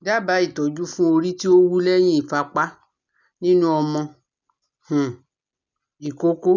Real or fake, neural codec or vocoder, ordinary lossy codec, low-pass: real; none; none; 7.2 kHz